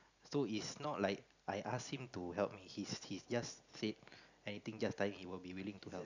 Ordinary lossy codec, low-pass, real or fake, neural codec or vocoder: none; 7.2 kHz; real; none